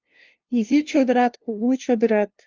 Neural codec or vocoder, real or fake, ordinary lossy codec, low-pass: codec, 16 kHz, 0.5 kbps, FunCodec, trained on LibriTTS, 25 frames a second; fake; Opus, 32 kbps; 7.2 kHz